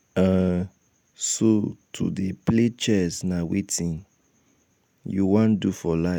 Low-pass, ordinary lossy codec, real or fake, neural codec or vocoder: 19.8 kHz; none; real; none